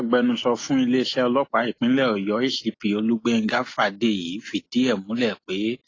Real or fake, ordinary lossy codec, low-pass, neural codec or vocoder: real; AAC, 32 kbps; 7.2 kHz; none